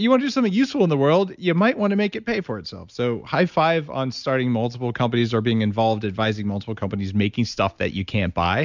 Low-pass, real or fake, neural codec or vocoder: 7.2 kHz; real; none